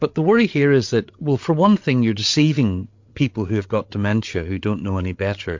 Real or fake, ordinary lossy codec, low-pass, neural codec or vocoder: fake; MP3, 48 kbps; 7.2 kHz; codec, 16 kHz, 8 kbps, FreqCodec, larger model